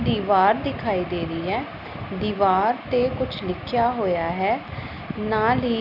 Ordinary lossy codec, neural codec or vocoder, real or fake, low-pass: none; none; real; 5.4 kHz